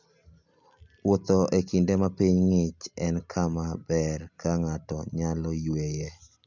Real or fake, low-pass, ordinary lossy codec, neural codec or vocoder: real; 7.2 kHz; none; none